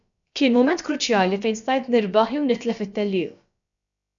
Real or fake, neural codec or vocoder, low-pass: fake; codec, 16 kHz, about 1 kbps, DyCAST, with the encoder's durations; 7.2 kHz